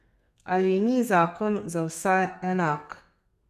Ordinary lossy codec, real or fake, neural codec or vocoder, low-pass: none; fake; codec, 32 kHz, 1.9 kbps, SNAC; 14.4 kHz